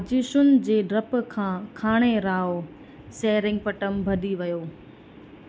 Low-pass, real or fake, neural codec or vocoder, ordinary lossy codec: none; real; none; none